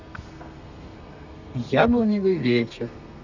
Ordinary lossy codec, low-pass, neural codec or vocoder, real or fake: none; 7.2 kHz; codec, 32 kHz, 1.9 kbps, SNAC; fake